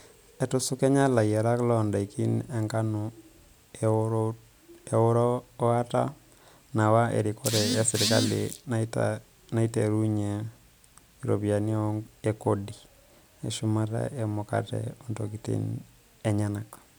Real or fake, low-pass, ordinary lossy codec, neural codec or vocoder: real; none; none; none